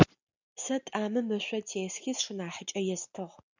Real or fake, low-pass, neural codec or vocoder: real; 7.2 kHz; none